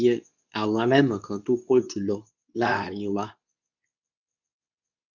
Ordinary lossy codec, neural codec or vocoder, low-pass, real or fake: none; codec, 24 kHz, 0.9 kbps, WavTokenizer, medium speech release version 2; 7.2 kHz; fake